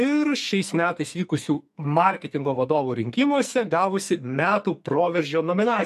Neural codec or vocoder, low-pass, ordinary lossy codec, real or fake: codec, 44.1 kHz, 2.6 kbps, SNAC; 14.4 kHz; MP3, 64 kbps; fake